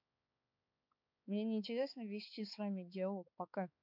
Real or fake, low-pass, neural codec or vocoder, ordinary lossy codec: fake; 5.4 kHz; codec, 16 kHz, 4 kbps, X-Codec, HuBERT features, trained on balanced general audio; none